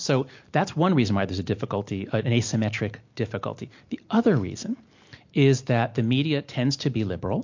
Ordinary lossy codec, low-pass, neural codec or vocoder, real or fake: MP3, 48 kbps; 7.2 kHz; none; real